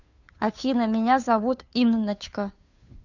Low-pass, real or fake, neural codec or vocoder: 7.2 kHz; fake; codec, 16 kHz, 2 kbps, FunCodec, trained on Chinese and English, 25 frames a second